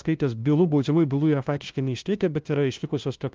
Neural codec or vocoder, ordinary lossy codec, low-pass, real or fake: codec, 16 kHz, 0.5 kbps, FunCodec, trained on LibriTTS, 25 frames a second; Opus, 32 kbps; 7.2 kHz; fake